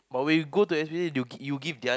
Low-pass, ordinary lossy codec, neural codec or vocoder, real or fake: none; none; none; real